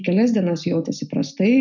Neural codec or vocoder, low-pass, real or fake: none; 7.2 kHz; real